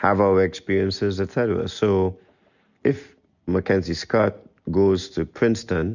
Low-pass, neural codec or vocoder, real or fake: 7.2 kHz; none; real